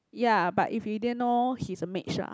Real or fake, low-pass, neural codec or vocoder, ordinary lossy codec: real; none; none; none